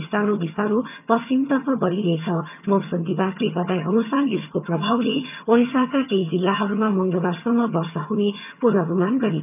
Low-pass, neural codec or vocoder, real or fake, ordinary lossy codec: 3.6 kHz; vocoder, 22.05 kHz, 80 mel bands, HiFi-GAN; fake; none